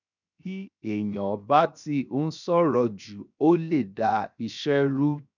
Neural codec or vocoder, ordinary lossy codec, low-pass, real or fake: codec, 16 kHz, 0.7 kbps, FocalCodec; none; 7.2 kHz; fake